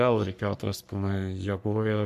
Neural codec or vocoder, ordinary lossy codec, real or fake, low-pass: codec, 44.1 kHz, 3.4 kbps, Pupu-Codec; MP3, 96 kbps; fake; 14.4 kHz